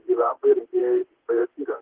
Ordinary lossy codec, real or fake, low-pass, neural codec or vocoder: Opus, 16 kbps; fake; 3.6 kHz; vocoder, 44.1 kHz, 128 mel bands, Pupu-Vocoder